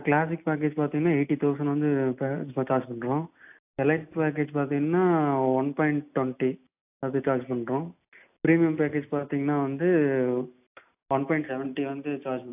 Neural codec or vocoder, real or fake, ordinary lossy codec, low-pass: none; real; AAC, 32 kbps; 3.6 kHz